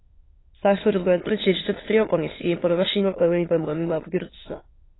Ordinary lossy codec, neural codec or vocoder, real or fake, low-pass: AAC, 16 kbps; autoencoder, 22.05 kHz, a latent of 192 numbers a frame, VITS, trained on many speakers; fake; 7.2 kHz